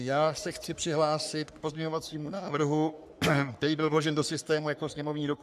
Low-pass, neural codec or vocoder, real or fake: 14.4 kHz; codec, 44.1 kHz, 3.4 kbps, Pupu-Codec; fake